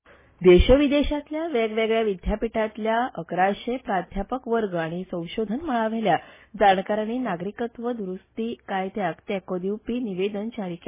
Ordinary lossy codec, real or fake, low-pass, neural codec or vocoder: MP3, 16 kbps; real; 3.6 kHz; none